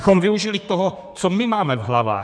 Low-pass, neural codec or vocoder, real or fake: 9.9 kHz; codec, 32 kHz, 1.9 kbps, SNAC; fake